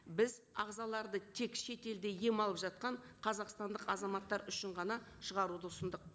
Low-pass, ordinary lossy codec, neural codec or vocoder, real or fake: none; none; none; real